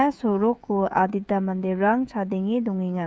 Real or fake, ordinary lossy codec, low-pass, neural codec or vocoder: fake; none; none; codec, 16 kHz, 16 kbps, FreqCodec, smaller model